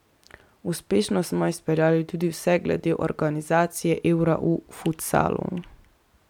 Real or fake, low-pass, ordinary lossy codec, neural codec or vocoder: fake; 19.8 kHz; none; vocoder, 44.1 kHz, 128 mel bands every 256 samples, BigVGAN v2